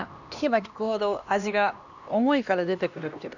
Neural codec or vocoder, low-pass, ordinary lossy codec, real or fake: codec, 16 kHz, 1 kbps, X-Codec, HuBERT features, trained on LibriSpeech; 7.2 kHz; none; fake